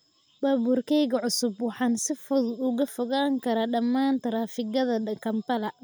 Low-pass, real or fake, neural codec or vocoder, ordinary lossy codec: none; real; none; none